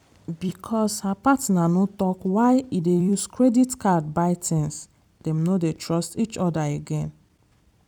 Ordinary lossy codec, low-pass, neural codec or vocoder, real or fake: none; 19.8 kHz; vocoder, 44.1 kHz, 128 mel bands every 256 samples, BigVGAN v2; fake